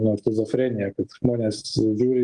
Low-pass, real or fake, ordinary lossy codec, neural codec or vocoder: 10.8 kHz; real; Opus, 24 kbps; none